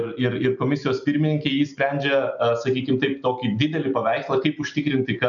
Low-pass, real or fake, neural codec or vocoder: 7.2 kHz; real; none